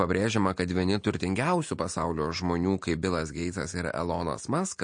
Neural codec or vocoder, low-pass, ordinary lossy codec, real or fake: none; 9.9 kHz; MP3, 48 kbps; real